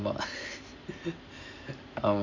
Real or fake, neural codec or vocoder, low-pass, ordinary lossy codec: real; none; 7.2 kHz; none